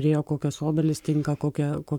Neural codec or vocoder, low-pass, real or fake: codec, 44.1 kHz, 7.8 kbps, Pupu-Codec; 19.8 kHz; fake